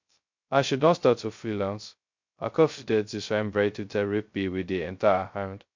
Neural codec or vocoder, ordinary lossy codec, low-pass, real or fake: codec, 16 kHz, 0.2 kbps, FocalCodec; MP3, 48 kbps; 7.2 kHz; fake